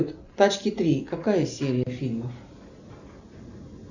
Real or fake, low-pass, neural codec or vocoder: real; 7.2 kHz; none